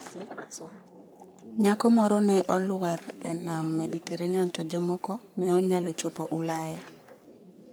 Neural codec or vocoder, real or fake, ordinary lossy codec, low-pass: codec, 44.1 kHz, 3.4 kbps, Pupu-Codec; fake; none; none